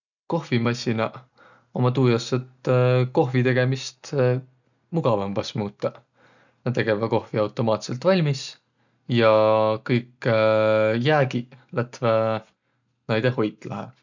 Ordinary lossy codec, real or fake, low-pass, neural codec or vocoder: none; real; 7.2 kHz; none